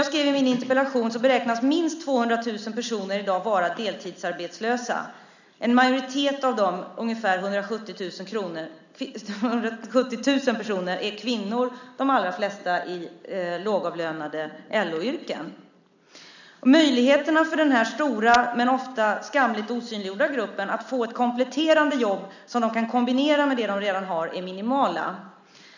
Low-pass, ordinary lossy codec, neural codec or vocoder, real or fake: 7.2 kHz; none; none; real